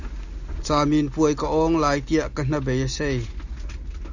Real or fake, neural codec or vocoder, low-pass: real; none; 7.2 kHz